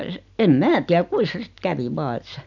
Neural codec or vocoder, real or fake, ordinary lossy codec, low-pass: none; real; none; 7.2 kHz